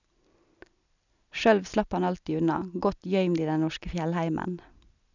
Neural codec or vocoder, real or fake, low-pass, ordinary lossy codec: none; real; 7.2 kHz; none